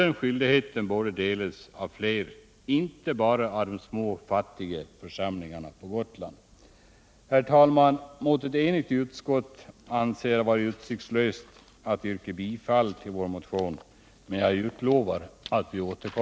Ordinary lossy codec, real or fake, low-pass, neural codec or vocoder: none; real; none; none